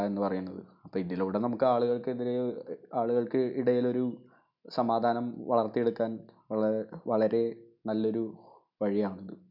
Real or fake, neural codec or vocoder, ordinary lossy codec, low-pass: real; none; none; 5.4 kHz